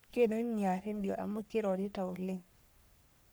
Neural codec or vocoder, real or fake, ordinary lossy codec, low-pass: codec, 44.1 kHz, 3.4 kbps, Pupu-Codec; fake; none; none